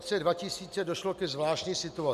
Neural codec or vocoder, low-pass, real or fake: none; 14.4 kHz; real